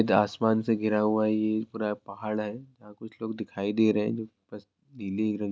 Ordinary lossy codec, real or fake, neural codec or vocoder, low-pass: none; real; none; none